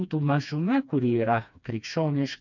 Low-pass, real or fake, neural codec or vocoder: 7.2 kHz; fake; codec, 16 kHz, 2 kbps, FreqCodec, smaller model